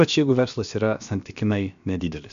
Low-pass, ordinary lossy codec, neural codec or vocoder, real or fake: 7.2 kHz; MP3, 64 kbps; codec, 16 kHz, about 1 kbps, DyCAST, with the encoder's durations; fake